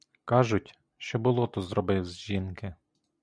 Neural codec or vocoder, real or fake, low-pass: none; real; 9.9 kHz